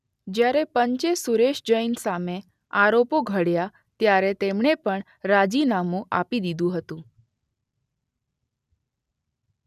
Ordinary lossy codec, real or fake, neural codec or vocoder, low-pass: none; real; none; 14.4 kHz